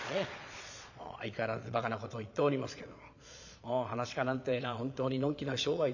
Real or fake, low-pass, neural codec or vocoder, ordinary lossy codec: fake; 7.2 kHz; vocoder, 22.05 kHz, 80 mel bands, Vocos; none